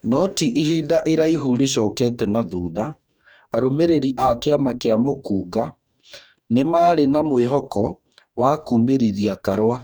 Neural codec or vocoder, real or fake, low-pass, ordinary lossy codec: codec, 44.1 kHz, 2.6 kbps, DAC; fake; none; none